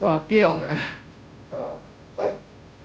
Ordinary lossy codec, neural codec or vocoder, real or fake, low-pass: none; codec, 16 kHz, 0.5 kbps, FunCodec, trained on Chinese and English, 25 frames a second; fake; none